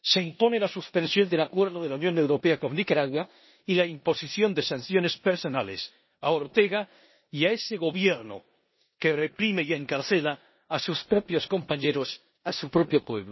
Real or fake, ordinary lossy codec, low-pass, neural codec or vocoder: fake; MP3, 24 kbps; 7.2 kHz; codec, 16 kHz in and 24 kHz out, 0.9 kbps, LongCat-Audio-Codec, four codebook decoder